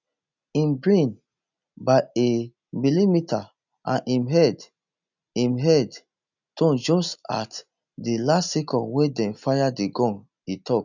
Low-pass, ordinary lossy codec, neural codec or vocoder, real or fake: 7.2 kHz; none; none; real